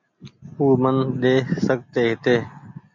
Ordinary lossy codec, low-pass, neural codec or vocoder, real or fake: MP3, 64 kbps; 7.2 kHz; none; real